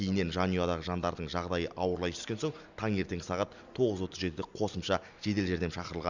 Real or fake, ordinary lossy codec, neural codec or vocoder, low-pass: real; none; none; 7.2 kHz